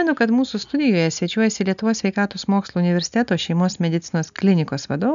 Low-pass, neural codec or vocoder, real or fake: 7.2 kHz; none; real